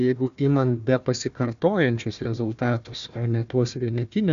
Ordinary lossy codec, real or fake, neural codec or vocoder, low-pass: AAC, 96 kbps; fake; codec, 16 kHz, 1 kbps, FunCodec, trained on Chinese and English, 50 frames a second; 7.2 kHz